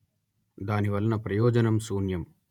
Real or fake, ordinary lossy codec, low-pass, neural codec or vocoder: fake; none; 19.8 kHz; vocoder, 48 kHz, 128 mel bands, Vocos